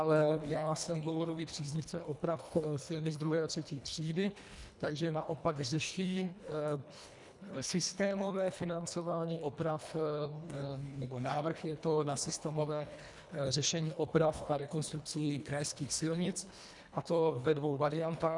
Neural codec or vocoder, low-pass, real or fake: codec, 24 kHz, 1.5 kbps, HILCodec; 10.8 kHz; fake